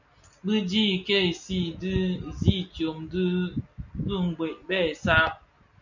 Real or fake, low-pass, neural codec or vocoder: real; 7.2 kHz; none